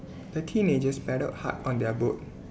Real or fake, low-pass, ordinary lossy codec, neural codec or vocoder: real; none; none; none